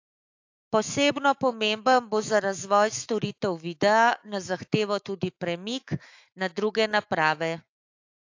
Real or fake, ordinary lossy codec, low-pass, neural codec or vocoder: fake; AAC, 48 kbps; 7.2 kHz; autoencoder, 48 kHz, 128 numbers a frame, DAC-VAE, trained on Japanese speech